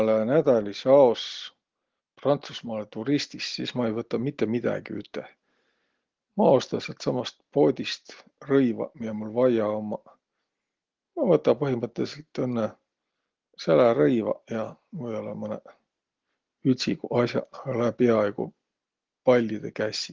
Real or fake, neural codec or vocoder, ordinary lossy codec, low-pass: real; none; Opus, 16 kbps; 7.2 kHz